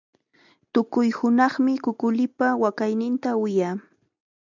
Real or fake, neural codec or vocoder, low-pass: real; none; 7.2 kHz